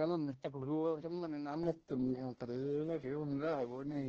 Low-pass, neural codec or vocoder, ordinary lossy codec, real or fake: 7.2 kHz; codec, 16 kHz, 1 kbps, X-Codec, HuBERT features, trained on balanced general audio; Opus, 16 kbps; fake